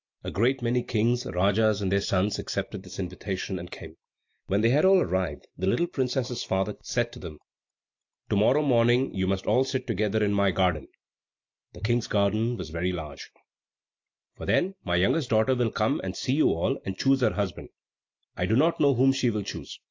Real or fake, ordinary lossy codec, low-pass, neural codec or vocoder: real; AAC, 48 kbps; 7.2 kHz; none